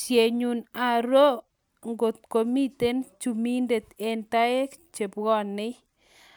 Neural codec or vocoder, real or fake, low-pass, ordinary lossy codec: none; real; none; none